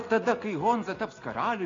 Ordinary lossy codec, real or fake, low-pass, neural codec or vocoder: AAC, 32 kbps; real; 7.2 kHz; none